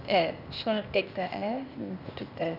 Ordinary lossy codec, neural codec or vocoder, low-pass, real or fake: none; codec, 16 kHz, 0.8 kbps, ZipCodec; 5.4 kHz; fake